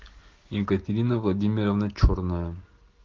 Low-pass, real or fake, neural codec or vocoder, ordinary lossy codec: 7.2 kHz; real; none; Opus, 16 kbps